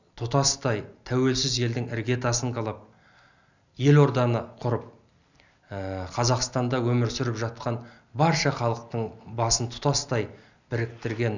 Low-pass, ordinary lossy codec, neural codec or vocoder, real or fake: 7.2 kHz; none; none; real